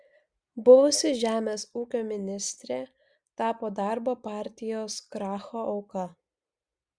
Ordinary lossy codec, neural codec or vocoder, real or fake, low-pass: Opus, 64 kbps; none; real; 9.9 kHz